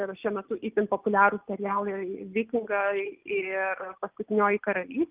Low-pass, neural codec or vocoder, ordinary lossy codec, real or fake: 3.6 kHz; none; Opus, 32 kbps; real